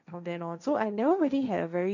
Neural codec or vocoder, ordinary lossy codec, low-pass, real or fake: codec, 16 kHz, 1.1 kbps, Voila-Tokenizer; none; 7.2 kHz; fake